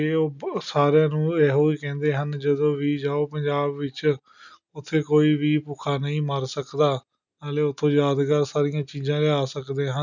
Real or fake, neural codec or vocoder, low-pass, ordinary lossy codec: real; none; 7.2 kHz; none